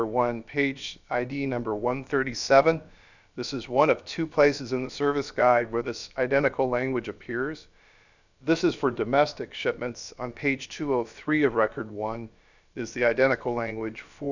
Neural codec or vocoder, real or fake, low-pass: codec, 16 kHz, about 1 kbps, DyCAST, with the encoder's durations; fake; 7.2 kHz